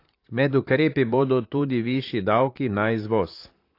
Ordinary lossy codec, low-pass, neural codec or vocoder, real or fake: AAC, 32 kbps; 5.4 kHz; vocoder, 44.1 kHz, 128 mel bands, Pupu-Vocoder; fake